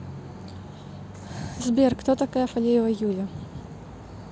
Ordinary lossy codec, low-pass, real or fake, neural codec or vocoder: none; none; real; none